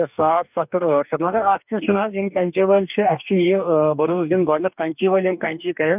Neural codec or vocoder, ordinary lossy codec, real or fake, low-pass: codec, 44.1 kHz, 2.6 kbps, DAC; none; fake; 3.6 kHz